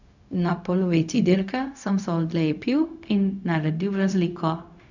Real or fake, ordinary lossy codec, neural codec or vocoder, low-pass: fake; none; codec, 16 kHz, 0.4 kbps, LongCat-Audio-Codec; 7.2 kHz